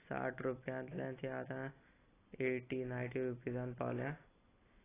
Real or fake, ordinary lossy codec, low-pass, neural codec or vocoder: real; AAC, 16 kbps; 3.6 kHz; none